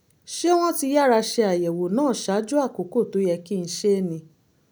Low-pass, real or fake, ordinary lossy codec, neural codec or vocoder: none; real; none; none